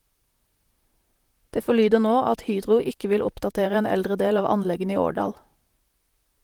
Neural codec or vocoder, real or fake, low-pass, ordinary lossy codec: vocoder, 44.1 kHz, 128 mel bands, Pupu-Vocoder; fake; 19.8 kHz; Opus, 24 kbps